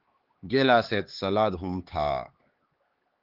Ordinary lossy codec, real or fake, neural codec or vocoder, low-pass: Opus, 16 kbps; fake; codec, 16 kHz, 4 kbps, X-Codec, HuBERT features, trained on LibriSpeech; 5.4 kHz